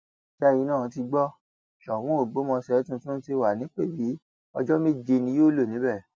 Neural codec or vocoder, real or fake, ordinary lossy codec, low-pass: none; real; none; none